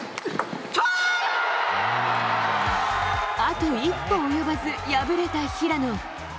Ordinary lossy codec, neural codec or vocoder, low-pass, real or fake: none; none; none; real